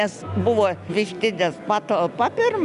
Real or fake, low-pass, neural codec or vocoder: real; 10.8 kHz; none